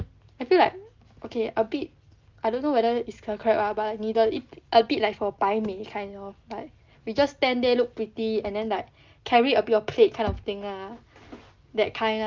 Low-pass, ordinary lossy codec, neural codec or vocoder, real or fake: 7.2 kHz; Opus, 24 kbps; none; real